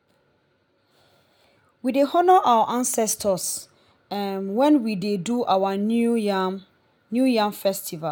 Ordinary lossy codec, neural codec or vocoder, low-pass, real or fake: none; none; none; real